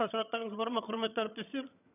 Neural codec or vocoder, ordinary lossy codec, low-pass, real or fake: vocoder, 22.05 kHz, 80 mel bands, HiFi-GAN; none; 3.6 kHz; fake